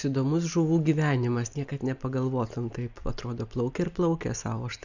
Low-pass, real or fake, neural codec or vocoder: 7.2 kHz; real; none